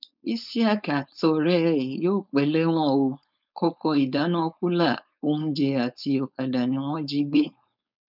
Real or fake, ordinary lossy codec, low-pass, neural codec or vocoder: fake; none; 5.4 kHz; codec, 16 kHz, 4.8 kbps, FACodec